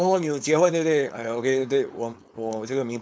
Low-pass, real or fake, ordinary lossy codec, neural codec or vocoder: none; fake; none; codec, 16 kHz, 4.8 kbps, FACodec